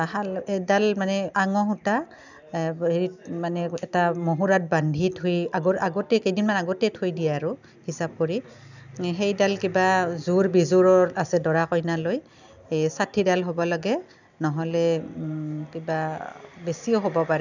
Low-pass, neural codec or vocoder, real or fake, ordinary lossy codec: 7.2 kHz; none; real; none